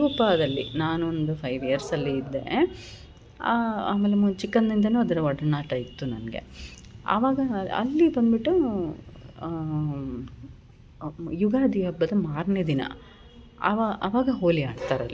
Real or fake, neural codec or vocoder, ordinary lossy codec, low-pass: real; none; none; none